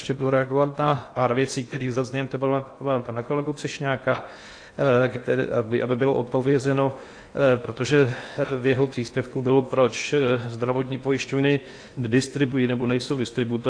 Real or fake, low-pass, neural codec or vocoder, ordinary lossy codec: fake; 9.9 kHz; codec, 16 kHz in and 24 kHz out, 0.8 kbps, FocalCodec, streaming, 65536 codes; AAC, 48 kbps